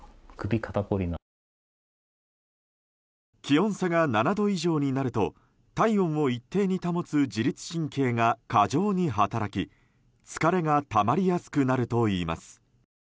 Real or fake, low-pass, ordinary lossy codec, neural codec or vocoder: real; none; none; none